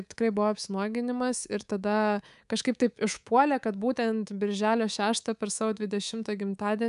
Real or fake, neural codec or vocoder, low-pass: fake; codec, 24 kHz, 3.1 kbps, DualCodec; 10.8 kHz